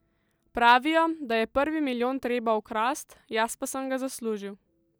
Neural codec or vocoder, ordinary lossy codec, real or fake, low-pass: none; none; real; none